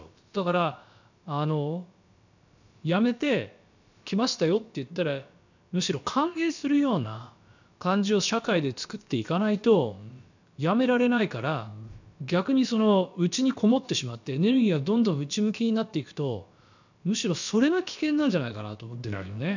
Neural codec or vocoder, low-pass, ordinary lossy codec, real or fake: codec, 16 kHz, about 1 kbps, DyCAST, with the encoder's durations; 7.2 kHz; none; fake